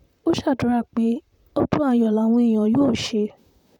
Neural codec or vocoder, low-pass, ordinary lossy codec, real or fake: vocoder, 44.1 kHz, 128 mel bands, Pupu-Vocoder; 19.8 kHz; none; fake